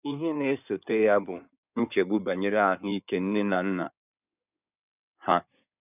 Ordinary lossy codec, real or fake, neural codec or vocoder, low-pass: none; fake; codec, 16 kHz in and 24 kHz out, 2.2 kbps, FireRedTTS-2 codec; 3.6 kHz